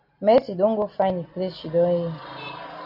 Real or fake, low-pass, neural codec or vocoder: real; 5.4 kHz; none